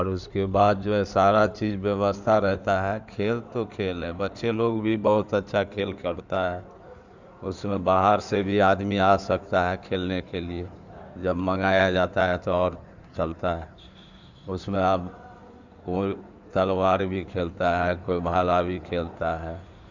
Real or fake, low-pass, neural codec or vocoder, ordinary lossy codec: fake; 7.2 kHz; codec, 16 kHz in and 24 kHz out, 2.2 kbps, FireRedTTS-2 codec; none